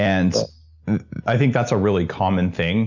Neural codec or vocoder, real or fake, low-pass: none; real; 7.2 kHz